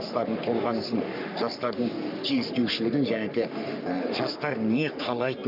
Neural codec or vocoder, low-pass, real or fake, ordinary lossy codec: codec, 44.1 kHz, 3.4 kbps, Pupu-Codec; 5.4 kHz; fake; none